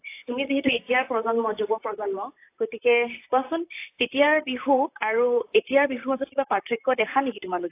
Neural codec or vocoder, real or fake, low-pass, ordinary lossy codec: vocoder, 44.1 kHz, 128 mel bands, Pupu-Vocoder; fake; 3.6 kHz; AAC, 24 kbps